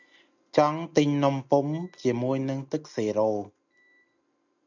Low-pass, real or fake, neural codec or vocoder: 7.2 kHz; real; none